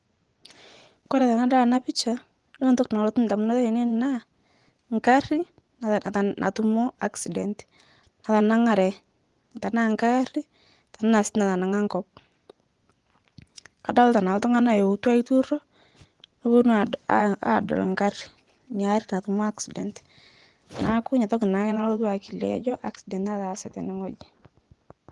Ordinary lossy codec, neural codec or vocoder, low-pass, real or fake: Opus, 24 kbps; vocoder, 24 kHz, 100 mel bands, Vocos; 10.8 kHz; fake